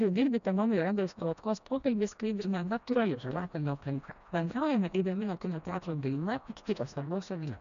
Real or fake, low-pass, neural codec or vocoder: fake; 7.2 kHz; codec, 16 kHz, 1 kbps, FreqCodec, smaller model